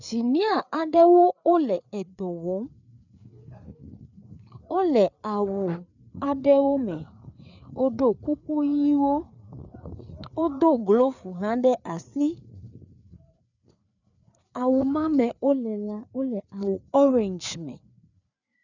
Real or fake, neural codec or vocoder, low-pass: fake; codec, 16 kHz, 4 kbps, FreqCodec, larger model; 7.2 kHz